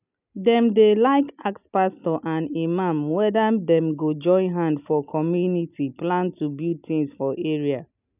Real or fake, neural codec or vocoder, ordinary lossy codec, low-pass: real; none; none; 3.6 kHz